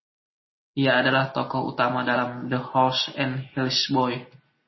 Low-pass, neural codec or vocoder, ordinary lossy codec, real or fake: 7.2 kHz; none; MP3, 24 kbps; real